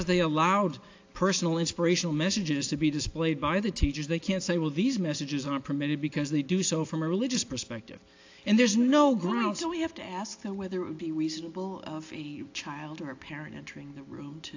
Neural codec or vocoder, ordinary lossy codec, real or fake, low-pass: none; AAC, 48 kbps; real; 7.2 kHz